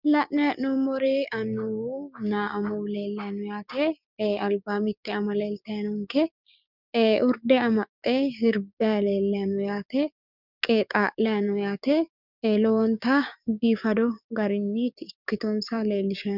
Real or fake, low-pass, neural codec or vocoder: fake; 5.4 kHz; codec, 16 kHz, 6 kbps, DAC